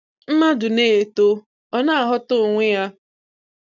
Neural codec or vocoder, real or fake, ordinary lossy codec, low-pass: none; real; none; 7.2 kHz